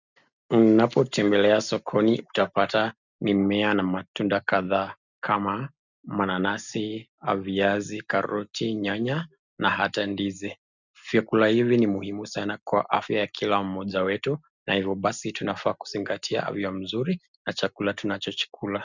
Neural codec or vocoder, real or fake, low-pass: none; real; 7.2 kHz